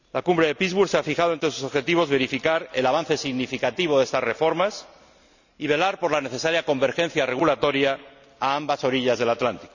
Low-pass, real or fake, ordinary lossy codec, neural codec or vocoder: 7.2 kHz; real; none; none